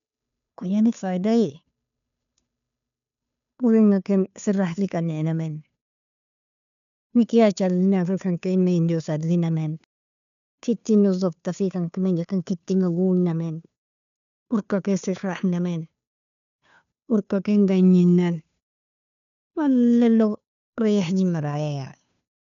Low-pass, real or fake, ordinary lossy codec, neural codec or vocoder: 7.2 kHz; fake; none; codec, 16 kHz, 2 kbps, FunCodec, trained on Chinese and English, 25 frames a second